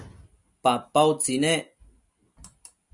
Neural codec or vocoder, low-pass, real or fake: none; 10.8 kHz; real